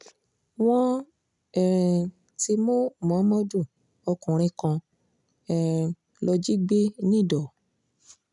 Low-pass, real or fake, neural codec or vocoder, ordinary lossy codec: 10.8 kHz; real; none; none